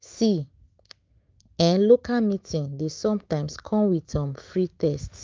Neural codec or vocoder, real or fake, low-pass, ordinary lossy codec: autoencoder, 48 kHz, 128 numbers a frame, DAC-VAE, trained on Japanese speech; fake; 7.2 kHz; Opus, 32 kbps